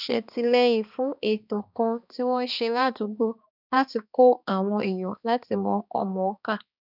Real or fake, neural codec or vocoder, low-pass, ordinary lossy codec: fake; codec, 16 kHz, 2 kbps, X-Codec, HuBERT features, trained on balanced general audio; 5.4 kHz; none